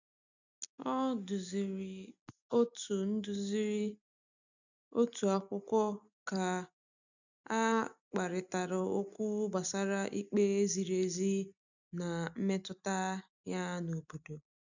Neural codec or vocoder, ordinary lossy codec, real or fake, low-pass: none; none; real; 7.2 kHz